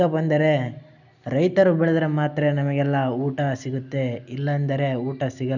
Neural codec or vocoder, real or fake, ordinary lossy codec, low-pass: none; real; none; 7.2 kHz